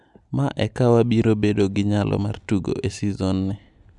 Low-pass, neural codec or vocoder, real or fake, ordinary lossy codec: 10.8 kHz; none; real; none